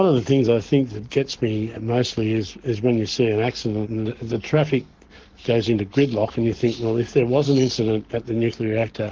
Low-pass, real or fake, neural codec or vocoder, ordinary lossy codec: 7.2 kHz; fake; codec, 44.1 kHz, 7.8 kbps, Pupu-Codec; Opus, 16 kbps